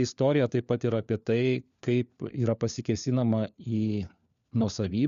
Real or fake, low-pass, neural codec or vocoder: fake; 7.2 kHz; codec, 16 kHz, 4 kbps, FunCodec, trained on LibriTTS, 50 frames a second